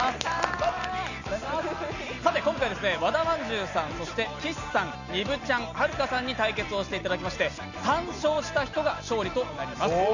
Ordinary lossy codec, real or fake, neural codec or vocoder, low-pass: none; real; none; 7.2 kHz